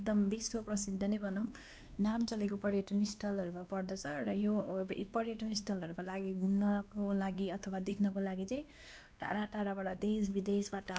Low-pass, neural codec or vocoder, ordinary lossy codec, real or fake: none; codec, 16 kHz, 2 kbps, X-Codec, WavLM features, trained on Multilingual LibriSpeech; none; fake